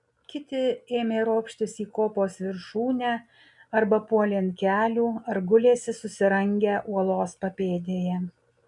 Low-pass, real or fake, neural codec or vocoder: 10.8 kHz; real; none